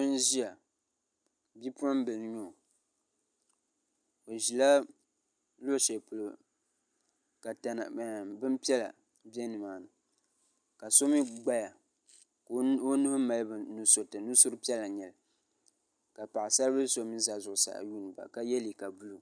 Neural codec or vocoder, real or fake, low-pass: none; real; 9.9 kHz